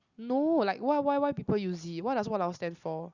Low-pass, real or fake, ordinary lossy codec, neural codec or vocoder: 7.2 kHz; real; Opus, 64 kbps; none